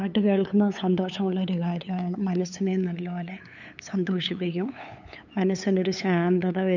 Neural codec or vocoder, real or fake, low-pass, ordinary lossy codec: codec, 16 kHz, 8 kbps, FunCodec, trained on LibriTTS, 25 frames a second; fake; 7.2 kHz; none